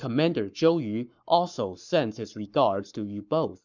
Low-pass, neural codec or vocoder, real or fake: 7.2 kHz; codec, 44.1 kHz, 7.8 kbps, Pupu-Codec; fake